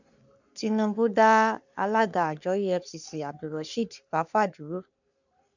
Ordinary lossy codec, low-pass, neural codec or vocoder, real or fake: none; 7.2 kHz; codec, 44.1 kHz, 3.4 kbps, Pupu-Codec; fake